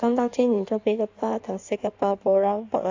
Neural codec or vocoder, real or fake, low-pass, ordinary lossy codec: codec, 16 kHz in and 24 kHz out, 1.1 kbps, FireRedTTS-2 codec; fake; 7.2 kHz; AAC, 48 kbps